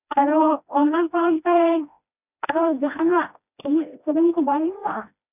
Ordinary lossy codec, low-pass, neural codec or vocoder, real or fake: none; 3.6 kHz; codec, 16 kHz, 1 kbps, FreqCodec, smaller model; fake